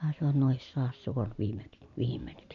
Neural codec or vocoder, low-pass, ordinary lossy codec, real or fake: none; 7.2 kHz; none; real